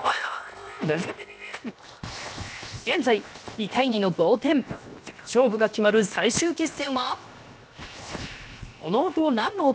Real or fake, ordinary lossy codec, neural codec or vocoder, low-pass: fake; none; codec, 16 kHz, 0.7 kbps, FocalCodec; none